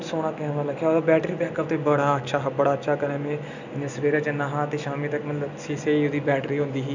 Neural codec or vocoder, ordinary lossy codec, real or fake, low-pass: none; none; real; 7.2 kHz